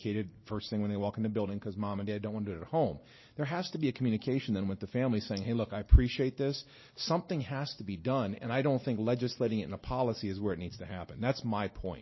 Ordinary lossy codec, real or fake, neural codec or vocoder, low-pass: MP3, 24 kbps; real; none; 7.2 kHz